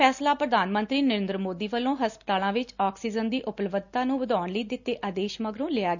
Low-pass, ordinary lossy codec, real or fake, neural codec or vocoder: 7.2 kHz; none; real; none